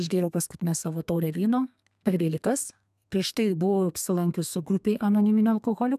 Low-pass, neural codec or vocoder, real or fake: 14.4 kHz; codec, 32 kHz, 1.9 kbps, SNAC; fake